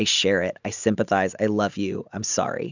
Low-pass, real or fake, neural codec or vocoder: 7.2 kHz; real; none